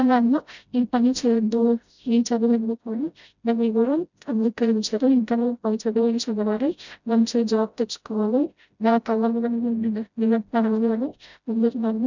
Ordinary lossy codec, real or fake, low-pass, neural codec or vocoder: none; fake; 7.2 kHz; codec, 16 kHz, 0.5 kbps, FreqCodec, smaller model